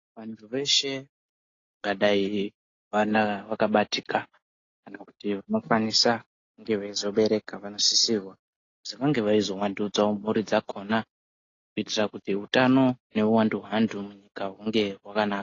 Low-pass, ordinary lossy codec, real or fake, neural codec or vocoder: 7.2 kHz; AAC, 32 kbps; real; none